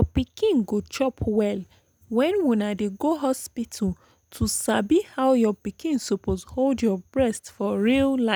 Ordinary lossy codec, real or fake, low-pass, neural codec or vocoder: none; real; none; none